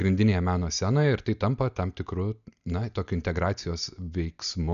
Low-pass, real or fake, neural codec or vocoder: 7.2 kHz; real; none